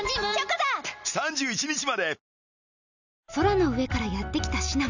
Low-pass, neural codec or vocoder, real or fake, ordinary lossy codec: 7.2 kHz; none; real; none